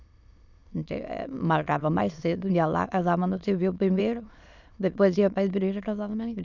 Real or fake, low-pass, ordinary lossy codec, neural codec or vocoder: fake; 7.2 kHz; none; autoencoder, 22.05 kHz, a latent of 192 numbers a frame, VITS, trained on many speakers